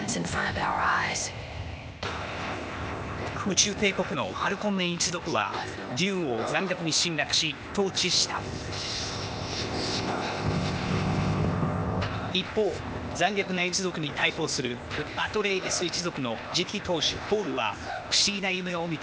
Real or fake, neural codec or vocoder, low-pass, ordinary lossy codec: fake; codec, 16 kHz, 0.8 kbps, ZipCodec; none; none